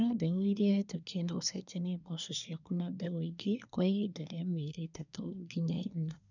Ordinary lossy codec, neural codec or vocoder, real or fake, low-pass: none; codec, 24 kHz, 1 kbps, SNAC; fake; 7.2 kHz